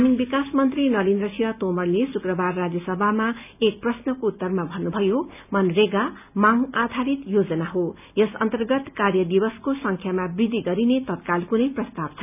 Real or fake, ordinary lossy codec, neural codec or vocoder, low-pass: real; none; none; 3.6 kHz